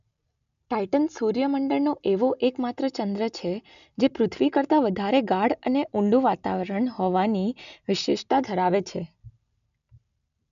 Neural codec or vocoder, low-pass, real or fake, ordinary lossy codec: none; 7.2 kHz; real; none